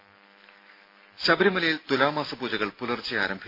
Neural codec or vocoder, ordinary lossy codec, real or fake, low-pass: none; MP3, 32 kbps; real; 5.4 kHz